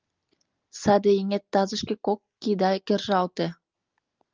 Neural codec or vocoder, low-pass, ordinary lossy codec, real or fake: none; 7.2 kHz; Opus, 32 kbps; real